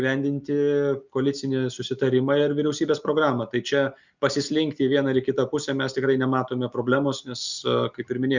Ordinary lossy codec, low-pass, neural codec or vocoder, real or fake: Opus, 64 kbps; 7.2 kHz; none; real